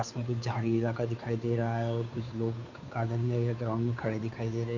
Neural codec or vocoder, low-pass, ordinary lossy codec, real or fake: codec, 16 kHz, 2 kbps, FunCodec, trained on Chinese and English, 25 frames a second; 7.2 kHz; Opus, 64 kbps; fake